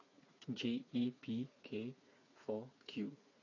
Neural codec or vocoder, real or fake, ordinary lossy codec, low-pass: codec, 16 kHz, 4 kbps, FreqCodec, smaller model; fake; AAC, 32 kbps; 7.2 kHz